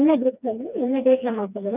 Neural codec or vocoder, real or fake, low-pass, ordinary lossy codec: codec, 16 kHz, 2 kbps, FreqCodec, smaller model; fake; 3.6 kHz; none